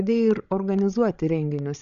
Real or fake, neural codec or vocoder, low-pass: fake; codec, 16 kHz, 16 kbps, FreqCodec, larger model; 7.2 kHz